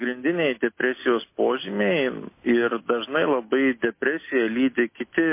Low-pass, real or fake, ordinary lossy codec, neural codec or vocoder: 3.6 kHz; real; MP3, 24 kbps; none